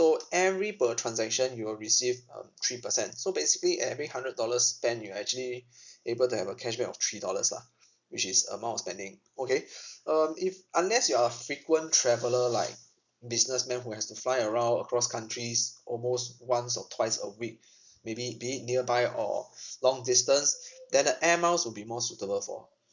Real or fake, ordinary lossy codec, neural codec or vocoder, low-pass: real; none; none; 7.2 kHz